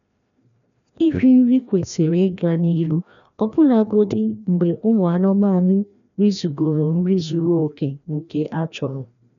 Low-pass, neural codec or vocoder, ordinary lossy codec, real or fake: 7.2 kHz; codec, 16 kHz, 1 kbps, FreqCodec, larger model; none; fake